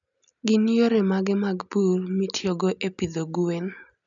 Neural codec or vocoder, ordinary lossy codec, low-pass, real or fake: none; none; 7.2 kHz; real